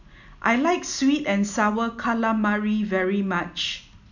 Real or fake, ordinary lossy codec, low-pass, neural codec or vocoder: real; none; 7.2 kHz; none